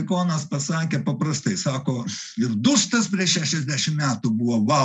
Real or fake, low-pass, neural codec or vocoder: real; 10.8 kHz; none